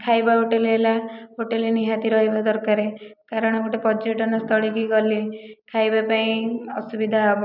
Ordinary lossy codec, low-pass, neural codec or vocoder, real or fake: none; 5.4 kHz; none; real